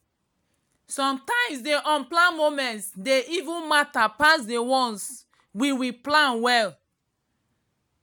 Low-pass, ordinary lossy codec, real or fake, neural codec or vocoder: none; none; real; none